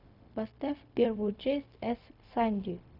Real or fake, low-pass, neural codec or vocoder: fake; 5.4 kHz; codec, 16 kHz, 0.4 kbps, LongCat-Audio-Codec